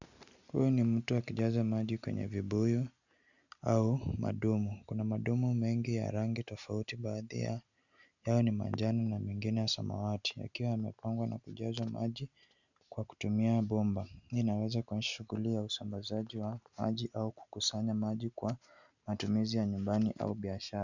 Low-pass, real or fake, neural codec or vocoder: 7.2 kHz; real; none